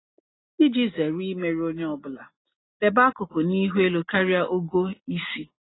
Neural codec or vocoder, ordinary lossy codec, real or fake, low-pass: none; AAC, 16 kbps; real; 7.2 kHz